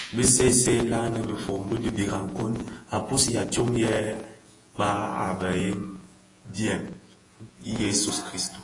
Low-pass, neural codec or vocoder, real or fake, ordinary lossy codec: 10.8 kHz; vocoder, 48 kHz, 128 mel bands, Vocos; fake; AAC, 32 kbps